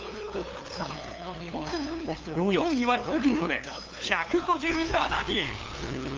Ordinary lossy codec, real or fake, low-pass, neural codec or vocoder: Opus, 24 kbps; fake; 7.2 kHz; codec, 16 kHz, 2 kbps, FunCodec, trained on LibriTTS, 25 frames a second